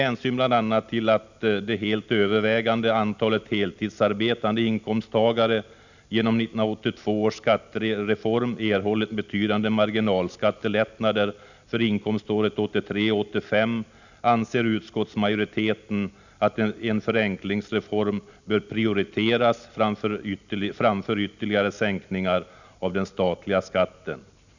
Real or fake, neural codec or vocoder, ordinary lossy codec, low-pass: real; none; none; 7.2 kHz